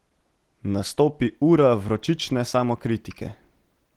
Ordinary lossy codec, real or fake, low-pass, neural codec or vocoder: Opus, 16 kbps; real; 19.8 kHz; none